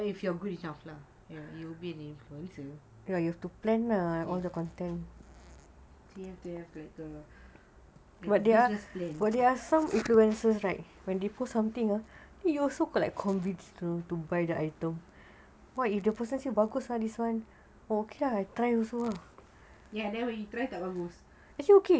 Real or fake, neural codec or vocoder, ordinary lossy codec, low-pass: real; none; none; none